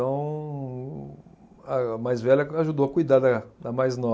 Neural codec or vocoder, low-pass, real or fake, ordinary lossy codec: none; none; real; none